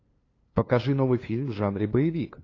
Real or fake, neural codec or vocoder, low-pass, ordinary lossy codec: fake; codec, 16 kHz, 2 kbps, FunCodec, trained on LibriTTS, 25 frames a second; 7.2 kHz; AAC, 32 kbps